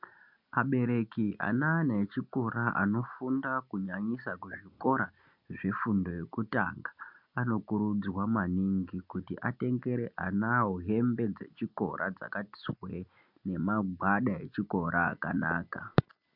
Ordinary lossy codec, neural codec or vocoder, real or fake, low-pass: MP3, 48 kbps; none; real; 5.4 kHz